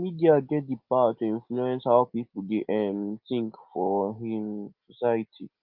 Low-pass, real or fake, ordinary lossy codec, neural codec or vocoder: 5.4 kHz; real; Opus, 24 kbps; none